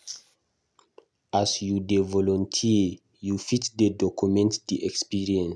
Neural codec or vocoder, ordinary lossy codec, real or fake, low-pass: none; none; real; none